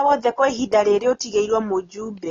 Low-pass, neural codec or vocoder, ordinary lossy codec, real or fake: 7.2 kHz; none; AAC, 32 kbps; real